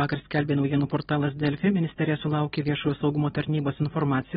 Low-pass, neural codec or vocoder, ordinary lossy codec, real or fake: 14.4 kHz; none; AAC, 16 kbps; real